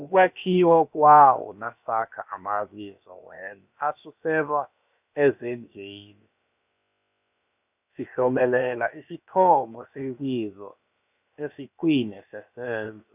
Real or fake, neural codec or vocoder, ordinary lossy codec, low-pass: fake; codec, 16 kHz, about 1 kbps, DyCAST, with the encoder's durations; none; 3.6 kHz